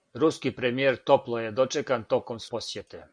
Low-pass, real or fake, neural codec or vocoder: 9.9 kHz; real; none